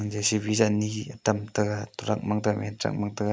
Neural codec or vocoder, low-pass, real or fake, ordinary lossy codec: none; none; real; none